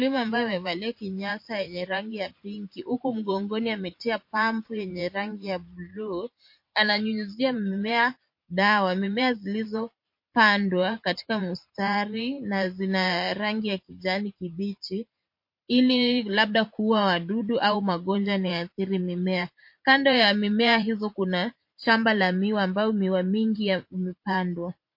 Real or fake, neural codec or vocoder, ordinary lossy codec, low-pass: fake; vocoder, 44.1 kHz, 128 mel bands every 512 samples, BigVGAN v2; MP3, 32 kbps; 5.4 kHz